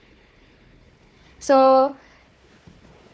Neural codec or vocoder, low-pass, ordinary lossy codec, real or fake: codec, 16 kHz, 4 kbps, FunCodec, trained on Chinese and English, 50 frames a second; none; none; fake